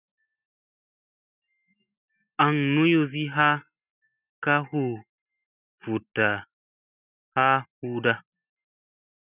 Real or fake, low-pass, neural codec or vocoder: real; 3.6 kHz; none